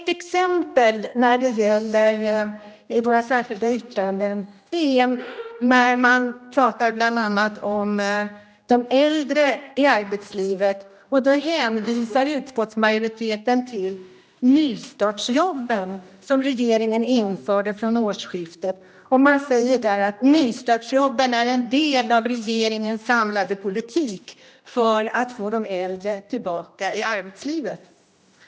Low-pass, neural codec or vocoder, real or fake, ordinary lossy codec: none; codec, 16 kHz, 1 kbps, X-Codec, HuBERT features, trained on general audio; fake; none